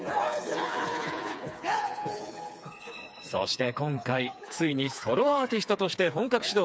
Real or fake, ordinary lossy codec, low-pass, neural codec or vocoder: fake; none; none; codec, 16 kHz, 4 kbps, FreqCodec, smaller model